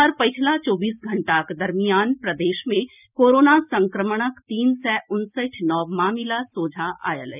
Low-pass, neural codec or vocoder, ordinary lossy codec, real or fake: 3.6 kHz; none; none; real